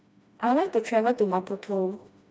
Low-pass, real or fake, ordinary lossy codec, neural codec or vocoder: none; fake; none; codec, 16 kHz, 1 kbps, FreqCodec, smaller model